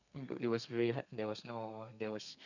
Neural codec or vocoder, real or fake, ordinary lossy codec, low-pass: codec, 32 kHz, 1.9 kbps, SNAC; fake; none; 7.2 kHz